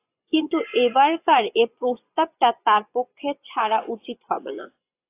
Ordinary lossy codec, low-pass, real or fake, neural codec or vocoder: AAC, 24 kbps; 3.6 kHz; fake; vocoder, 24 kHz, 100 mel bands, Vocos